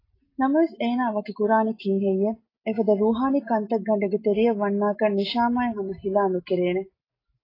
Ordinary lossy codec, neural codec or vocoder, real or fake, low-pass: AAC, 24 kbps; none; real; 5.4 kHz